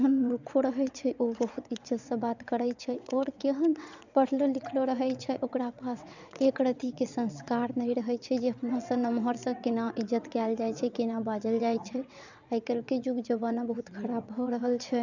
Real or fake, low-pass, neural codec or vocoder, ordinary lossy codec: fake; 7.2 kHz; vocoder, 22.05 kHz, 80 mel bands, WaveNeXt; none